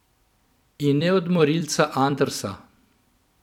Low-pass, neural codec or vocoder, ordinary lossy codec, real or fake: 19.8 kHz; vocoder, 44.1 kHz, 128 mel bands every 256 samples, BigVGAN v2; none; fake